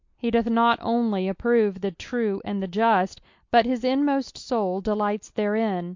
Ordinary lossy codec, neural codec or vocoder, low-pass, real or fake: MP3, 48 kbps; none; 7.2 kHz; real